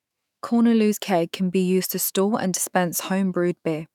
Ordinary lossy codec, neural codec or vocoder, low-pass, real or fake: none; autoencoder, 48 kHz, 128 numbers a frame, DAC-VAE, trained on Japanese speech; 19.8 kHz; fake